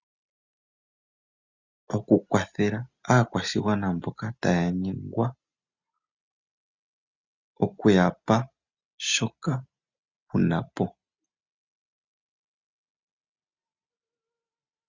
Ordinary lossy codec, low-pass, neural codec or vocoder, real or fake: Opus, 64 kbps; 7.2 kHz; none; real